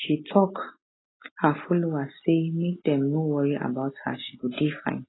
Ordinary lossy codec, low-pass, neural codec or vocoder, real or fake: AAC, 16 kbps; 7.2 kHz; none; real